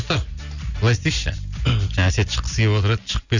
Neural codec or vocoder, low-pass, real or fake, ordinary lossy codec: none; 7.2 kHz; real; none